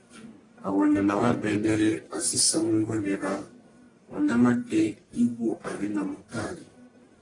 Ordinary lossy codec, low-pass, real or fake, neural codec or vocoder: AAC, 32 kbps; 10.8 kHz; fake; codec, 44.1 kHz, 1.7 kbps, Pupu-Codec